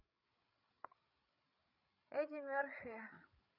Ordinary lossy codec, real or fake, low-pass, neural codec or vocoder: none; fake; 5.4 kHz; codec, 16 kHz, 8 kbps, FreqCodec, larger model